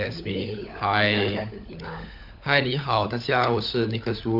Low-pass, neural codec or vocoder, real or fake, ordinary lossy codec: 5.4 kHz; codec, 16 kHz, 16 kbps, FunCodec, trained on LibriTTS, 50 frames a second; fake; none